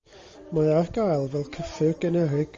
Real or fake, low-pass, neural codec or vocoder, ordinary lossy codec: real; 7.2 kHz; none; Opus, 32 kbps